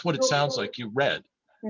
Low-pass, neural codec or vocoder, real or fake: 7.2 kHz; none; real